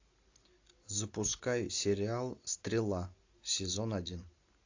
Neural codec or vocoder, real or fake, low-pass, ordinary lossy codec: none; real; 7.2 kHz; AAC, 48 kbps